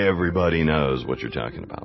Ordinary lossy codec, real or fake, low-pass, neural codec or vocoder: MP3, 24 kbps; real; 7.2 kHz; none